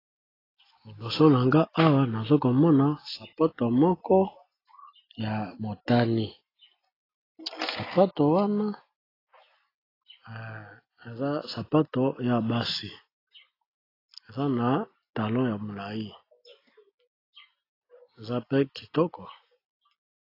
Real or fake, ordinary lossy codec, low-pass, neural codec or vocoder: real; AAC, 24 kbps; 5.4 kHz; none